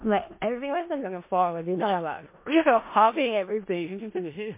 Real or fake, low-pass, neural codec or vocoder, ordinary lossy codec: fake; 3.6 kHz; codec, 16 kHz in and 24 kHz out, 0.4 kbps, LongCat-Audio-Codec, four codebook decoder; MP3, 24 kbps